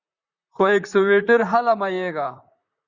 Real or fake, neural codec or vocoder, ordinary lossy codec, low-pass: fake; vocoder, 44.1 kHz, 128 mel bands, Pupu-Vocoder; Opus, 64 kbps; 7.2 kHz